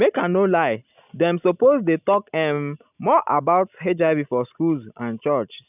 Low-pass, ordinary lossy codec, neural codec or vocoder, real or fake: 3.6 kHz; none; none; real